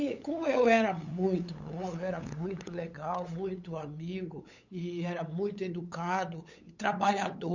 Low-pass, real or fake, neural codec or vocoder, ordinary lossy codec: 7.2 kHz; fake; codec, 16 kHz, 8 kbps, FunCodec, trained on LibriTTS, 25 frames a second; none